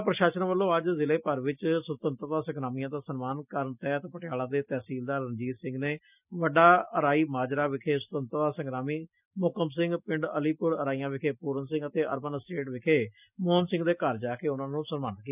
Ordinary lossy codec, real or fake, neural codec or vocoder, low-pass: none; real; none; 3.6 kHz